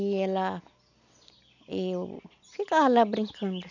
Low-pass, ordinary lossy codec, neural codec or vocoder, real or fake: 7.2 kHz; none; none; real